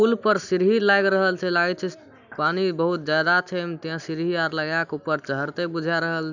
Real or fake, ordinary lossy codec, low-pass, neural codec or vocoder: real; none; 7.2 kHz; none